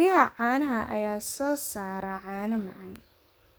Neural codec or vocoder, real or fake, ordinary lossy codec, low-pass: codec, 44.1 kHz, 2.6 kbps, SNAC; fake; none; none